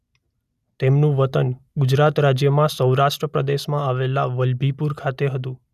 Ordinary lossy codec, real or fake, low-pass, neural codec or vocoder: none; real; 14.4 kHz; none